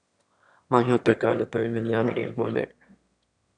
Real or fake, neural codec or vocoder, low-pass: fake; autoencoder, 22.05 kHz, a latent of 192 numbers a frame, VITS, trained on one speaker; 9.9 kHz